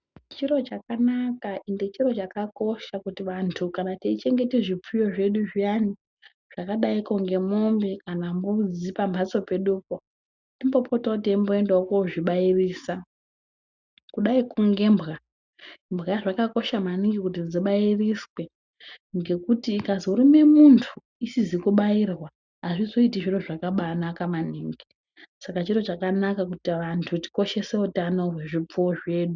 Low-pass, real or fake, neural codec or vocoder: 7.2 kHz; real; none